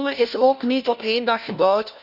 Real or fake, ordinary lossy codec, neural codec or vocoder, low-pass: fake; none; codec, 16 kHz, 1 kbps, FunCodec, trained on LibriTTS, 50 frames a second; 5.4 kHz